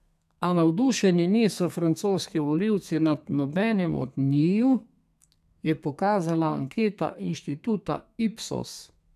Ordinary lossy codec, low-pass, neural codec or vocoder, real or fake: none; 14.4 kHz; codec, 32 kHz, 1.9 kbps, SNAC; fake